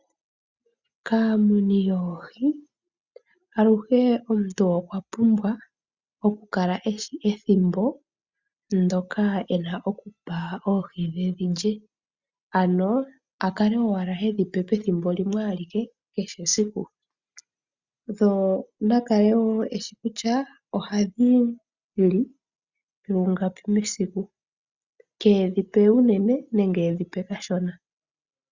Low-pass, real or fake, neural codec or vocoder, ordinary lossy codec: 7.2 kHz; real; none; Opus, 64 kbps